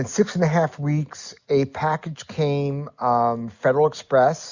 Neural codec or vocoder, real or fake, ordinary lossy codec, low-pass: none; real; Opus, 64 kbps; 7.2 kHz